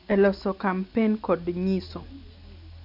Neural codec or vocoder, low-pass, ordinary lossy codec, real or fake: none; 5.4 kHz; AAC, 48 kbps; real